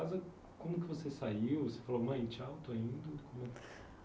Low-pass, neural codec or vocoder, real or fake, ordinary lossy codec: none; none; real; none